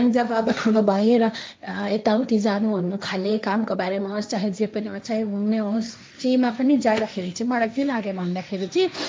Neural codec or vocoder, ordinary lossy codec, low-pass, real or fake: codec, 16 kHz, 1.1 kbps, Voila-Tokenizer; none; none; fake